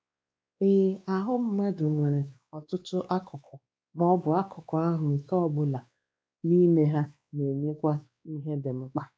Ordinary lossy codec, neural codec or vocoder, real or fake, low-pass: none; codec, 16 kHz, 2 kbps, X-Codec, WavLM features, trained on Multilingual LibriSpeech; fake; none